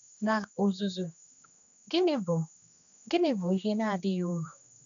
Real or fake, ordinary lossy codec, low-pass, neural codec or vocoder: fake; none; 7.2 kHz; codec, 16 kHz, 2 kbps, X-Codec, HuBERT features, trained on general audio